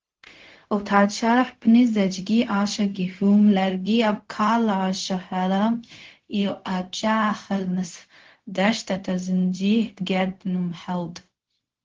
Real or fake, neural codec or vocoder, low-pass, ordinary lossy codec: fake; codec, 16 kHz, 0.4 kbps, LongCat-Audio-Codec; 7.2 kHz; Opus, 16 kbps